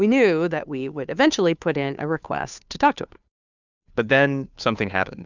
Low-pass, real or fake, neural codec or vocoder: 7.2 kHz; fake; codec, 16 kHz, 2 kbps, FunCodec, trained on Chinese and English, 25 frames a second